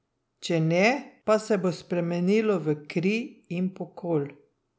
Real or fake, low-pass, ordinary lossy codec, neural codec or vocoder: real; none; none; none